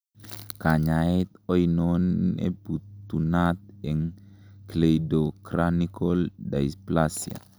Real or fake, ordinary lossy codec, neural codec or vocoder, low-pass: real; none; none; none